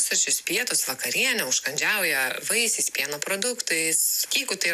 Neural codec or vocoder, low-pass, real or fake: none; 14.4 kHz; real